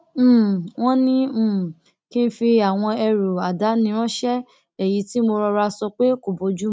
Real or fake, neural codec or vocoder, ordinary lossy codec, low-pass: real; none; none; none